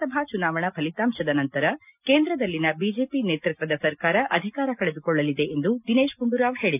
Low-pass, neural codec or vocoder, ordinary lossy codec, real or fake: 3.6 kHz; none; none; real